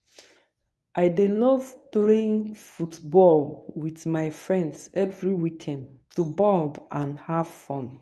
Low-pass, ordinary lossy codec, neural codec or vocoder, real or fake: none; none; codec, 24 kHz, 0.9 kbps, WavTokenizer, medium speech release version 1; fake